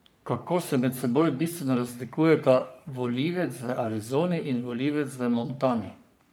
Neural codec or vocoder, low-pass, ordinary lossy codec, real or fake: codec, 44.1 kHz, 3.4 kbps, Pupu-Codec; none; none; fake